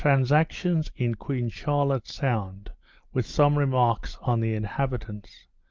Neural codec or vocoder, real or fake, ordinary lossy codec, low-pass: none; real; Opus, 32 kbps; 7.2 kHz